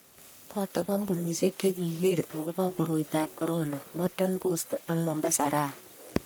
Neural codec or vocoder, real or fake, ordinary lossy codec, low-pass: codec, 44.1 kHz, 1.7 kbps, Pupu-Codec; fake; none; none